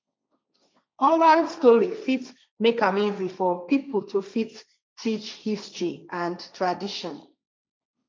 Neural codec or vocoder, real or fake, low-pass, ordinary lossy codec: codec, 16 kHz, 1.1 kbps, Voila-Tokenizer; fake; none; none